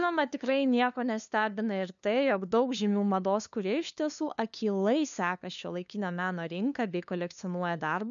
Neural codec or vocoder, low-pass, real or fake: codec, 16 kHz, 2 kbps, FunCodec, trained on LibriTTS, 25 frames a second; 7.2 kHz; fake